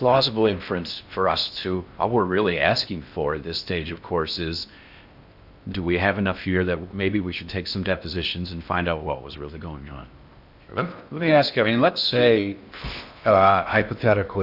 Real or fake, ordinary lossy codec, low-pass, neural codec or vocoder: fake; AAC, 48 kbps; 5.4 kHz; codec, 16 kHz in and 24 kHz out, 0.6 kbps, FocalCodec, streaming, 4096 codes